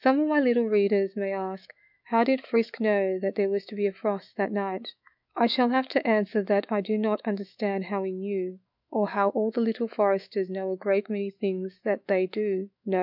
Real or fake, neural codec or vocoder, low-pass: fake; autoencoder, 48 kHz, 128 numbers a frame, DAC-VAE, trained on Japanese speech; 5.4 kHz